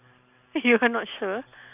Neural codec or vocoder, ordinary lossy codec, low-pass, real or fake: none; none; 3.6 kHz; real